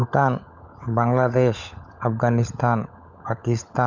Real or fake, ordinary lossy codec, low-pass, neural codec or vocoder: fake; none; 7.2 kHz; codec, 16 kHz, 16 kbps, FunCodec, trained on LibriTTS, 50 frames a second